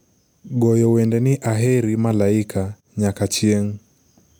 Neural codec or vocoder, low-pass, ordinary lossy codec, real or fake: none; none; none; real